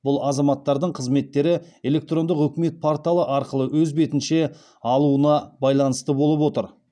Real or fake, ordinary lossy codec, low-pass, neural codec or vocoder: real; none; 9.9 kHz; none